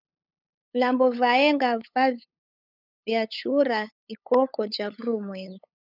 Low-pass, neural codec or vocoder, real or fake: 5.4 kHz; codec, 16 kHz, 8 kbps, FunCodec, trained on LibriTTS, 25 frames a second; fake